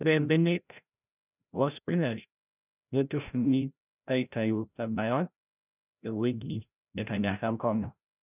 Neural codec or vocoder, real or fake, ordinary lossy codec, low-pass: codec, 16 kHz, 0.5 kbps, FreqCodec, larger model; fake; none; 3.6 kHz